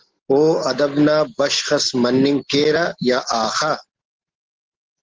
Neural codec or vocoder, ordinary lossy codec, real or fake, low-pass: none; Opus, 16 kbps; real; 7.2 kHz